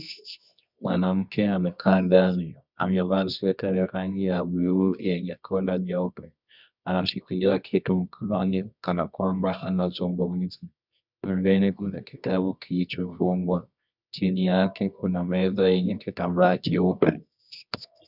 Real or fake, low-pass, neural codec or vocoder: fake; 5.4 kHz; codec, 24 kHz, 0.9 kbps, WavTokenizer, medium music audio release